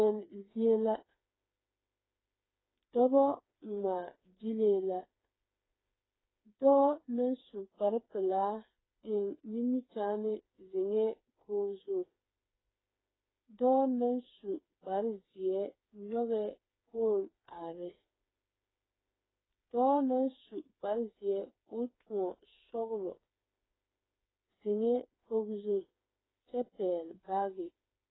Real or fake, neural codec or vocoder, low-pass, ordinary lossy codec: fake; codec, 16 kHz, 4 kbps, FreqCodec, smaller model; 7.2 kHz; AAC, 16 kbps